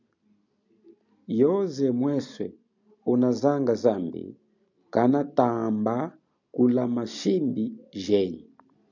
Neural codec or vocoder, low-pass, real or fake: none; 7.2 kHz; real